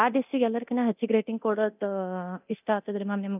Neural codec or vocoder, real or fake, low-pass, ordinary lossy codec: codec, 24 kHz, 0.9 kbps, DualCodec; fake; 3.6 kHz; none